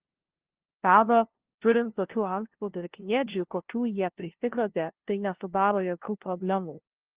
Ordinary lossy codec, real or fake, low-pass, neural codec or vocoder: Opus, 16 kbps; fake; 3.6 kHz; codec, 16 kHz, 0.5 kbps, FunCodec, trained on LibriTTS, 25 frames a second